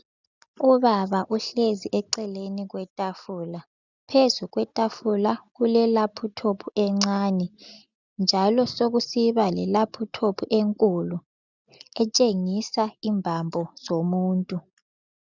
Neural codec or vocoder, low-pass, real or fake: none; 7.2 kHz; real